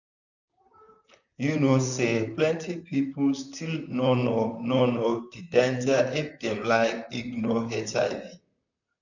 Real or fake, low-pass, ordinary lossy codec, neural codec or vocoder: fake; 7.2 kHz; none; vocoder, 44.1 kHz, 128 mel bands, Pupu-Vocoder